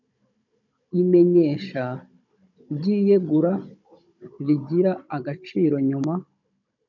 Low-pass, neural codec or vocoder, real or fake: 7.2 kHz; codec, 16 kHz, 16 kbps, FunCodec, trained on Chinese and English, 50 frames a second; fake